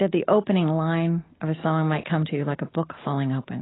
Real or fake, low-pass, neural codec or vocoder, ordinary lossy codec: real; 7.2 kHz; none; AAC, 16 kbps